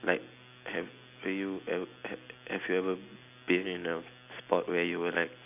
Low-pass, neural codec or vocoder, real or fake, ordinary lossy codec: 3.6 kHz; none; real; none